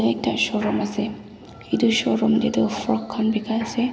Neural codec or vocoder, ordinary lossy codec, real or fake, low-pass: none; none; real; none